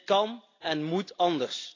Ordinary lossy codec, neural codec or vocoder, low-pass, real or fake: AAC, 32 kbps; none; 7.2 kHz; real